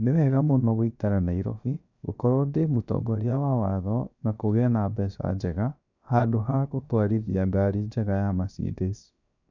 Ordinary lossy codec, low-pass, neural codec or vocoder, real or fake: none; 7.2 kHz; codec, 16 kHz, 0.7 kbps, FocalCodec; fake